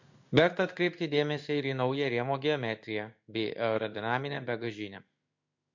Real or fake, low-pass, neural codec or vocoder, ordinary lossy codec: fake; 7.2 kHz; codec, 16 kHz in and 24 kHz out, 1 kbps, XY-Tokenizer; MP3, 48 kbps